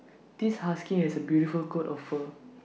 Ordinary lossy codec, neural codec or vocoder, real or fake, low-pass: none; none; real; none